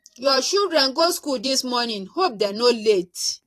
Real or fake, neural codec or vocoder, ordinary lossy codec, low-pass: fake; vocoder, 44.1 kHz, 128 mel bands every 512 samples, BigVGAN v2; AAC, 48 kbps; 14.4 kHz